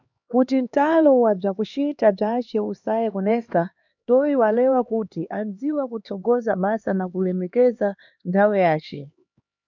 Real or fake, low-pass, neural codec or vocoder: fake; 7.2 kHz; codec, 16 kHz, 2 kbps, X-Codec, HuBERT features, trained on LibriSpeech